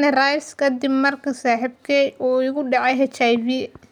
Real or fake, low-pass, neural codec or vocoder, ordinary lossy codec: real; 19.8 kHz; none; none